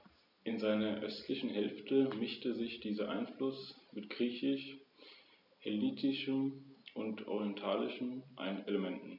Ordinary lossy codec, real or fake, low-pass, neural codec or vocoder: none; real; 5.4 kHz; none